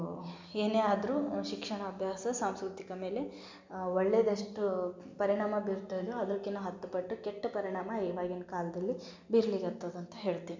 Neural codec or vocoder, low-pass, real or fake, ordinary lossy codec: none; 7.2 kHz; real; MP3, 64 kbps